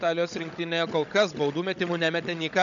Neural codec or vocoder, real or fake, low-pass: codec, 16 kHz, 16 kbps, FunCodec, trained on Chinese and English, 50 frames a second; fake; 7.2 kHz